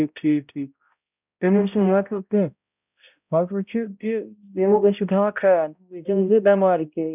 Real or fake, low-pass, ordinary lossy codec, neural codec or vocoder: fake; 3.6 kHz; none; codec, 16 kHz, 0.5 kbps, X-Codec, HuBERT features, trained on balanced general audio